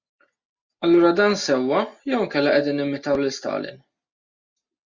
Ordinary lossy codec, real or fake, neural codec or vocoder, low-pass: Opus, 64 kbps; real; none; 7.2 kHz